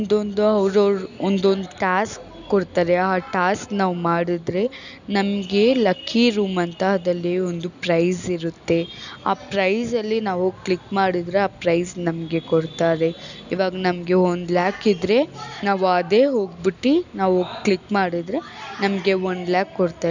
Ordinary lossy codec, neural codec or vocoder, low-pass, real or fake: none; none; 7.2 kHz; real